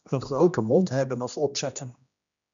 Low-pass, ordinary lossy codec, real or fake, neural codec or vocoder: 7.2 kHz; MP3, 64 kbps; fake; codec, 16 kHz, 1 kbps, X-Codec, HuBERT features, trained on general audio